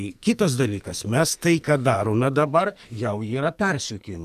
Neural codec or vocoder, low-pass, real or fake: codec, 44.1 kHz, 2.6 kbps, SNAC; 14.4 kHz; fake